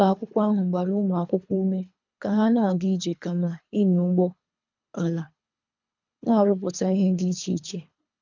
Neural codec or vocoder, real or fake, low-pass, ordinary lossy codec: codec, 24 kHz, 3 kbps, HILCodec; fake; 7.2 kHz; none